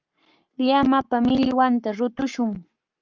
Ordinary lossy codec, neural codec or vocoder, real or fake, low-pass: Opus, 24 kbps; codec, 44.1 kHz, 7.8 kbps, Pupu-Codec; fake; 7.2 kHz